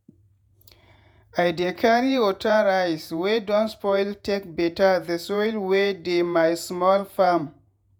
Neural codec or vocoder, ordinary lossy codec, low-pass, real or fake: vocoder, 48 kHz, 128 mel bands, Vocos; none; none; fake